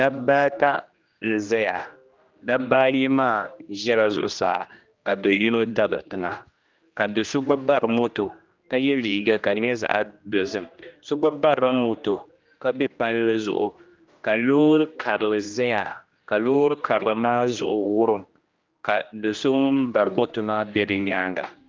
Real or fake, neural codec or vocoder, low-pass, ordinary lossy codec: fake; codec, 16 kHz, 1 kbps, X-Codec, HuBERT features, trained on general audio; 7.2 kHz; Opus, 24 kbps